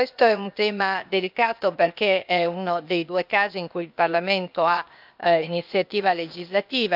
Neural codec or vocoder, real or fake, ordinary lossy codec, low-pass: codec, 16 kHz, 0.8 kbps, ZipCodec; fake; none; 5.4 kHz